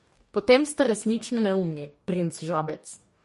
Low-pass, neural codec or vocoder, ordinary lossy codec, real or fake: 14.4 kHz; codec, 44.1 kHz, 2.6 kbps, DAC; MP3, 48 kbps; fake